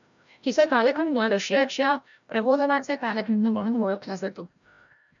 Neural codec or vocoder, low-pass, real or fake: codec, 16 kHz, 0.5 kbps, FreqCodec, larger model; 7.2 kHz; fake